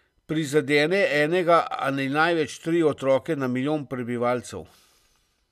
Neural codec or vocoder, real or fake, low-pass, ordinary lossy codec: none; real; 14.4 kHz; none